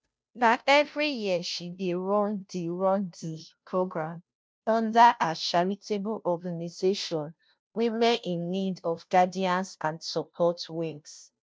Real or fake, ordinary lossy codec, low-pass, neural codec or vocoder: fake; none; none; codec, 16 kHz, 0.5 kbps, FunCodec, trained on Chinese and English, 25 frames a second